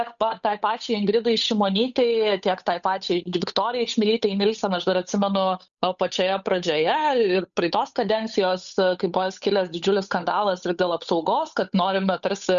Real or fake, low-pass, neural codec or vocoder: fake; 7.2 kHz; codec, 16 kHz, 8 kbps, FunCodec, trained on Chinese and English, 25 frames a second